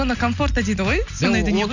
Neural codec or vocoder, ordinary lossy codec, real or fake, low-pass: none; none; real; 7.2 kHz